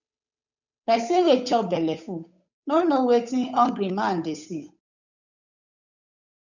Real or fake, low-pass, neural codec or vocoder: fake; 7.2 kHz; codec, 16 kHz, 8 kbps, FunCodec, trained on Chinese and English, 25 frames a second